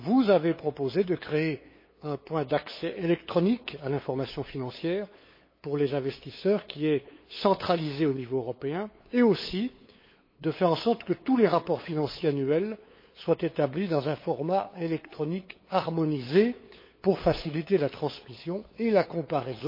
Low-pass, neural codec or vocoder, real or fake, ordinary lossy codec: 5.4 kHz; codec, 16 kHz, 8 kbps, FunCodec, trained on LibriTTS, 25 frames a second; fake; MP3, 24 kbps